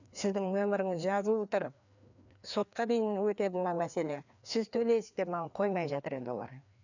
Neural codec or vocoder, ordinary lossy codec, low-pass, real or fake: codec, 16 kHz, 2 kbps, FreqCodec, larger model; none; 7.2 kHz; fake